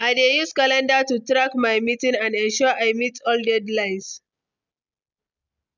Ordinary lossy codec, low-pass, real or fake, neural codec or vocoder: none; 7.2 kHz; real; none